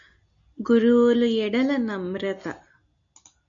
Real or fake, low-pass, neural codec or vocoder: real; 7.2 kHz; none